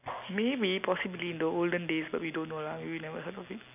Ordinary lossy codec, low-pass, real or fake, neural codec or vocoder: none; 3.6 kHz; real; none